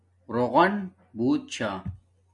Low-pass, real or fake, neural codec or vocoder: 10.8 kHz; real; none